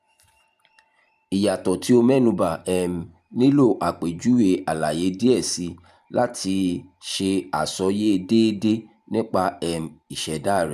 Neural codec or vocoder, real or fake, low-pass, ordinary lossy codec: vocoder, 44.1 kHz, 128 mel bands every 512 samples, BigVGAN v2; fake; 14.4 kHz; none